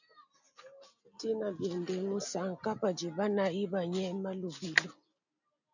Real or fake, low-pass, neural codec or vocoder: real; 7.2 kHz; none